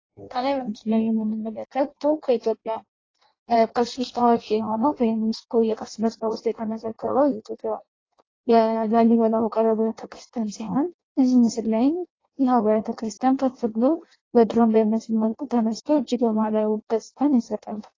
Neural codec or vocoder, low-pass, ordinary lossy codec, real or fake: codec, 16 kHz in and 24 kHz out, 0.6 kbps, FireRedTTS-2 codec; 7.2 kHz; AAC, 32 kbps; fake